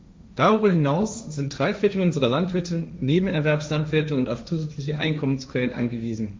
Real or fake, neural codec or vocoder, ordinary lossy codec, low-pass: fake; codec, 16 kHz, 1.1 kbps, Voila-Tokenizer; none; none